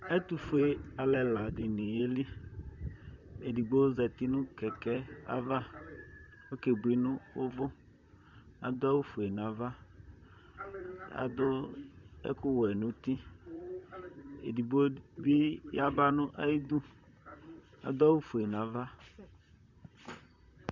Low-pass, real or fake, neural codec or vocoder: 7.2 kHz; fake; vocoder, 44.1 kHz, 128 mel bands, Pupu-Vocoder